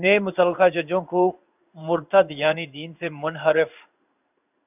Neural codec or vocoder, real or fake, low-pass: codec, 16 kHz in and 24 kHz out, 1 kbps, XY-Tokenizer; fake; 3.6 kHz